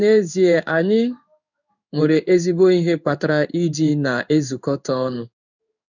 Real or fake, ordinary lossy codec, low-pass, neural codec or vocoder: fake; none; 7.2 kHz; codec, 16 kHz in and 24 kHz out, 1 kbps, XY-Tokenizer